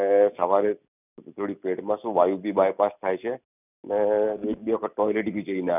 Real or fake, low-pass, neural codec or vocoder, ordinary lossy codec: real; 3.6 kHz; none; none